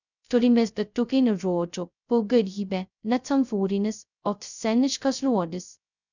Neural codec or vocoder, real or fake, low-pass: codec, 16 kHz, 0.2 kbps, FocalCodec; fake; 7.2 kHz